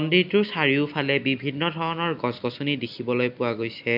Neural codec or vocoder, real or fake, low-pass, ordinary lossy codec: none; real; 5.4 kHz; none